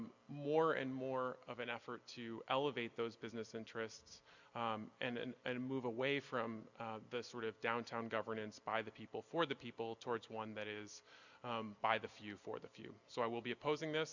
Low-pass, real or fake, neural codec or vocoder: 7.2 kHz; real; none